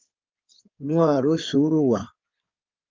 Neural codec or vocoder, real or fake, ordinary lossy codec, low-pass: codec, 16 kHz in and 24 kHz out, 2.2 kbps, FireRedTTS-2 codec; fake; Opus, 24 kbps; 7.2 kHz